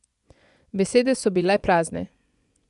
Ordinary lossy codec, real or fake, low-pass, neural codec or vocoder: none; fake; 10.8 kHz; vocoder, 24 kHz, 100 mel bands, Vocos